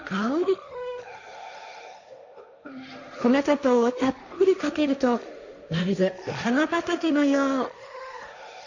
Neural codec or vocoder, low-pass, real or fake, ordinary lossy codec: codec, 16 kHz, 1.1 kbps, Voila-Tokenizer; 7.2 kHz; fake; none